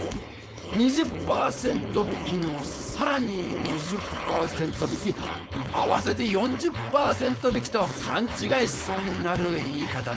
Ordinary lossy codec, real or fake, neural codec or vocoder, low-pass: none; fake; codec, 16 kHz, 4.8 kbps, FACodec; none